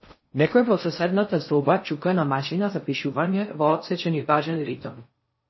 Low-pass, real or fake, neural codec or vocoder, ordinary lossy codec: 7.2 kHz; fake; codec, 16 kHz in and 24 kHz out, 0.6 kbps, FocalCodec, streaming, 4096 codes; MP3, 24 kbps